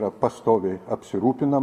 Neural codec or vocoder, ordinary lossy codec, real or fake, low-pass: none; Opus, 64 kbps; real; 14.4 kHz